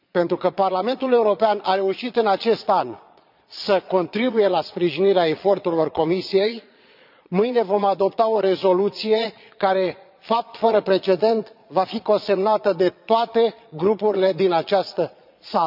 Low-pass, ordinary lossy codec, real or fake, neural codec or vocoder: 5.4 kHz; none; fake; vocoder, 22.05 kHz, 80 mel bands, Vocos